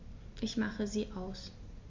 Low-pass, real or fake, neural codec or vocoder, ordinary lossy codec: 7.2 kHz; real; none; MP3, 48 kbps